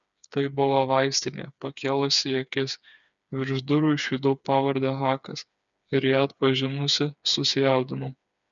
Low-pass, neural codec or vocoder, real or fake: 7.2 kHz; codec, 16 kHz, 4 kbps, FreqCodec, smaller model; fake